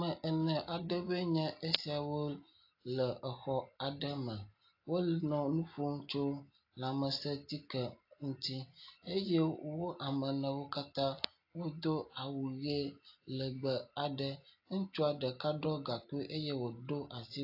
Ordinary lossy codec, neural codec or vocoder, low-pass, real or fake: AAC, 32 kbps; none; 5.4 kHz; real